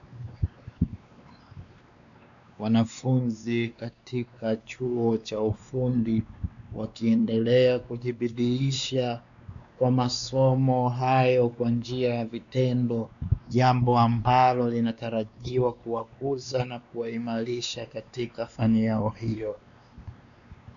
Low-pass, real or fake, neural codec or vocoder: 7.2 kHz; fake; codec, 16 kHz, 2 kbps, X-Codec, WavLM features, trained on Multilingual LibriSpeech